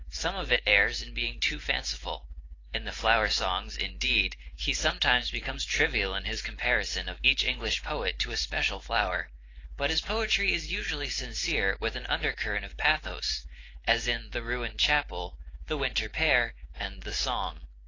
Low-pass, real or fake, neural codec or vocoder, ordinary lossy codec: 7.2 kHz; real; none; AAC, 32 kbps